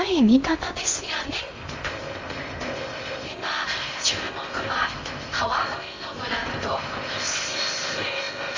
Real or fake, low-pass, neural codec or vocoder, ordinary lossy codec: fake; 7.2 kHz; codec, 16 kHz in and 24 kHz out, 0.6 kbps, FocalCodec, streaming, 2048 codes; Opus, 32 kbps